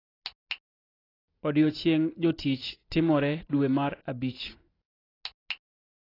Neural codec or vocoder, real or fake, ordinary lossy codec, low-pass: none; real; AAC, 24 kbps; 5.4 kHz